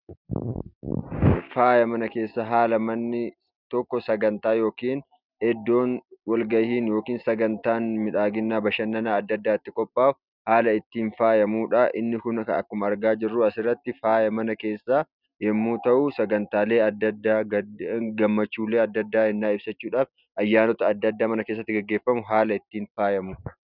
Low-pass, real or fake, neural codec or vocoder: 5.4 kHz; real; none